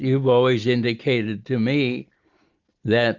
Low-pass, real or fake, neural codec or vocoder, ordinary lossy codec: 7.2 kHz; real; none; Opus, 64 kbps